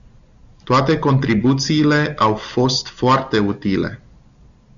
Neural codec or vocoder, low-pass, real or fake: none; 7.2 kHz; real